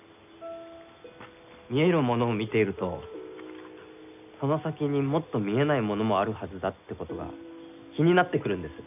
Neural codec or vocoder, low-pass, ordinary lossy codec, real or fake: none; 3.6 kHz; none; real